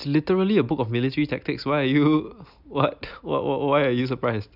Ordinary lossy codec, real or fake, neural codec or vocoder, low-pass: none; real; none; 5.4 kHz